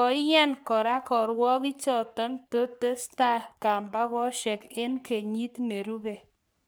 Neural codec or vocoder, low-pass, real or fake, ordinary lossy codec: codec, 44.1 kHz, 3.4 kbps, Pupu-Codec; none; fake; none